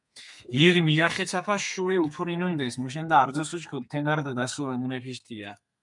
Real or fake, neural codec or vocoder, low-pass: fake; codec, 32 kHz, 1.9 kbps, SNAC; 10.8 kHz